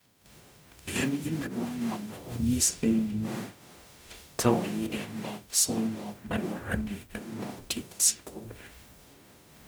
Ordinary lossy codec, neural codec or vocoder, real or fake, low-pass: none; codec, 44.1 kHz, 0.9 kbps, DAC; fake; none